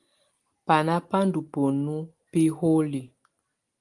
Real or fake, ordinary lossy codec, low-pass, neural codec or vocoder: real; Opus, 24 kbps; 10.8 kHz; none